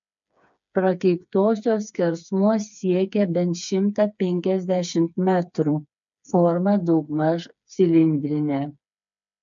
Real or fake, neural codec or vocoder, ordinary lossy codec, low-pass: fake; codec, 16 kHz, 4 kbps, FreqCodec, smaller model; MP3, 64 kbps; 7.2 kHz